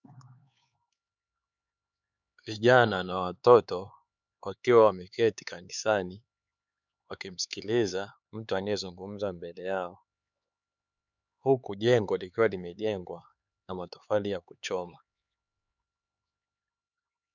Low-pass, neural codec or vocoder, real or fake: 7.2 kHz; codec, 16 kHz, 4 kbps, X-Codec, HuBERT features, trained on LibriSpeech; fake